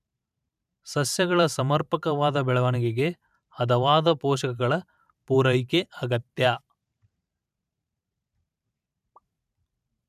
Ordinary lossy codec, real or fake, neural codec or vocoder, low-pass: none; fake; vocoder, 44.1 kHz, 128 mel bands every 512 samples, BigVGAN v2; 14.4 kHz